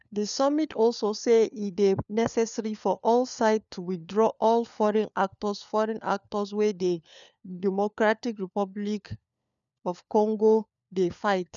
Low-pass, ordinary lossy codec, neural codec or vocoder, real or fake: 7.2 kHz; none; codec, 16 kHz, 4 kbps, FunCodec, trained on LibriTTS, 50 frames a second; fake